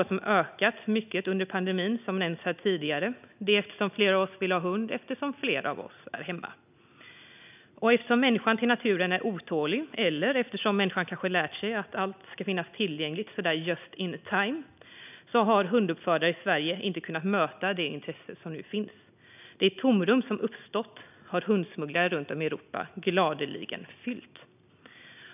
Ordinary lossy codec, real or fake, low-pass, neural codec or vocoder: none; real; 3.6 kHz; none